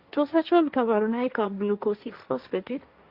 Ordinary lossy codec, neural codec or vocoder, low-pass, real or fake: Opus, 64 kbps; codec, 16 kHz, 1.1 kbps, Voila-Tokenizer; 5.4 kHz; fake